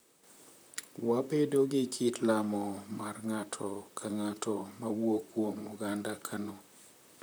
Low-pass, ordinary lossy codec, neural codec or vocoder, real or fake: none; none; vocoder, 44.1 kHz, 128 mel bands, Pupu-Vocoder; fake